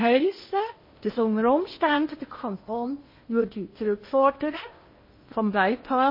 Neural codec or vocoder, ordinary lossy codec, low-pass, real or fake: codec, 16 kHz in and 24 kHz out, 0.8 kbps, FocalCodec, streaming, 65536 codes; MP3, 24 kbps; 5.4 kHz; fake